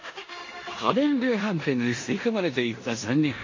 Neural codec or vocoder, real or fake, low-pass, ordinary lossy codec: codec, 16 kHz in and 24 kHz out, 0.9 kbps, LongCat-Audio-Codec, four codebook decoder; fake; 7.2 kHz; MP3, 32 kbps